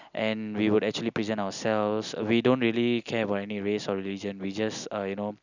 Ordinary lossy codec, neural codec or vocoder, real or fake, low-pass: none; none; real; 7.2 kHz